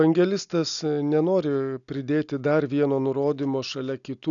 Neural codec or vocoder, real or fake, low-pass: none; real; 7.2 kHz